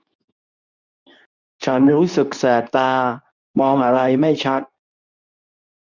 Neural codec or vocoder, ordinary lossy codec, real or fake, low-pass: codec, 24 kHz, 0.9 kbps, WavTokenizer, medium speech release version 2; none; fake; 7.2 kHz